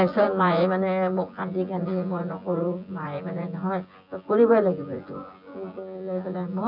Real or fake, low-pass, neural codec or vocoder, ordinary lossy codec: fake; 5.4 kHz; vocoder, 24 kHz, 100 mel bands, Vocos; none